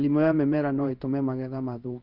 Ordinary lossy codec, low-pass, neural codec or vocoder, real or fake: Opus, 32 kbps; 5.4 kHz; codec, 16 kHz in and 24 kHz out, 1 kbps, XY-Tokenizer; fake